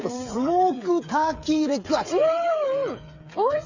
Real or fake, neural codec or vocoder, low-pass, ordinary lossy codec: fake; codec, 16 kHz, 8 kbps, FreqCodec, smaller model; 7.2 kHz; Opus, 64 kbps